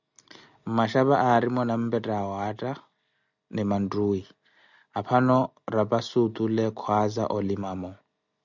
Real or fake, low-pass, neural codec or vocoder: real; 7.2 kHz; none